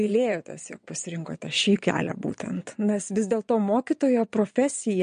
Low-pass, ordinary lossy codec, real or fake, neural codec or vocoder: 9.9 kHz; MP3, 48 kbps; fake; vocoder, 22.05 kHz, 80 mel bands, Vocos